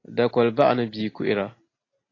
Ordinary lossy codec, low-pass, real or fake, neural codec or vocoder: AAC, 32 kbps; 7.2 kHz; real; none